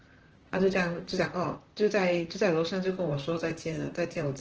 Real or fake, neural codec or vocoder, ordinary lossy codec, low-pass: fake; vocoder, 44.1 kHz, 128 mel bands, Pupu-Vocoder; Opus, 16 kbps; 7.2 kHz